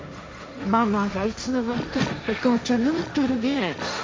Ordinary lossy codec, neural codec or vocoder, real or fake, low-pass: none; codec, 16 kHz, 1.1 kbps, Voila-Tokenizer; fake; none